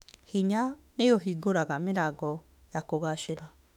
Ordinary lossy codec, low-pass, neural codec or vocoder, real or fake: none; 19.8 kHz; autoencoder, 48 kHz, 32 numbers a frame, DAC-VAE, trained on Japanese speech; fake